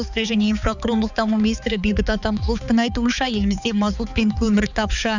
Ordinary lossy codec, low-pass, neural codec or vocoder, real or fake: none; 7.2 kHz; codec, 16 kHz, 4 kbps, X-Codec, HuBERT features, trained on balanced general audio; fake